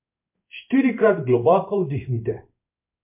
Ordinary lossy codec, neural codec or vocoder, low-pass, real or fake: MP3, 32 kbps; codec, 16 kHz in and 24 kHz out, 1 kbps, XY-Tokenizer; 3.6 kHz; fake